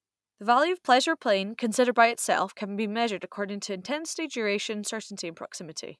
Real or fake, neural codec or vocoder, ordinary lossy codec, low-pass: real; none; none; none